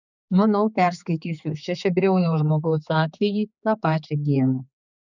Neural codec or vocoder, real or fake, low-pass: codec, 16 kHz, 4 kbps, X-Codec, HuBERT features, trained on general audio; fake; 7.2 kHz